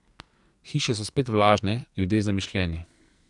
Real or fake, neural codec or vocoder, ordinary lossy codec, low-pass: fake; codec, 44.1 kHz, 2.6 kbps, SNAC; none; 10.8 kHz